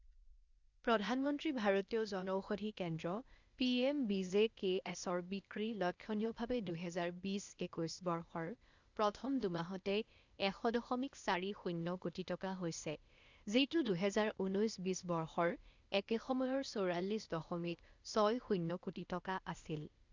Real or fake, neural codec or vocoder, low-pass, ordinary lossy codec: fake; codec, 16 kHz, 0.8 kbps, ZipCodec; 7.2 kHz; Opus, 64 kbps